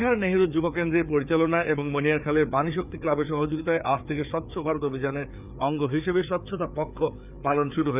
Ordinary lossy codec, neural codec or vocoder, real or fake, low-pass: none; codec, 16 kHz, 4 kbps, FreqCodec, larger model; fake; 3.6 kHz